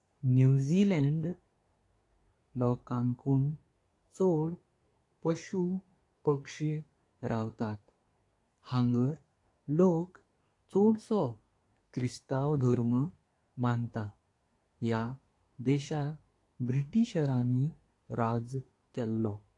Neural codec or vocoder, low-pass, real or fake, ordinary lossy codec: codec, 24 kHz, 1 kbps, SNAC; 10.8 kHz; fake; AAC, 48 kbps